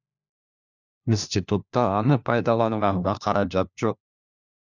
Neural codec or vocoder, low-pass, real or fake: codec, 16 kHz, 1 kbps, FunCodec, trained on LibriTTS, 50 frames a second; 7.2 kHz; fake